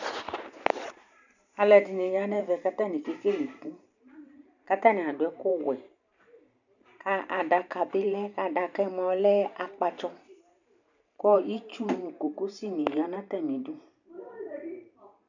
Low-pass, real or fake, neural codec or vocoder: 7.2 kHz; fake; vocoder, 44.1 kHz, 128 mel bands every 512 samples, BigVGAN v2